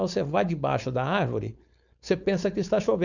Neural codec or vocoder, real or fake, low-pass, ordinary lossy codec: codec, 16 kHz, 4.8 kbps, FACodec; fake; 7.2 kHz; none